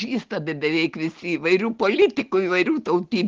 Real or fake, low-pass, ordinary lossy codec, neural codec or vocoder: real; 7.2 kHz; Opus, 16 kbps; none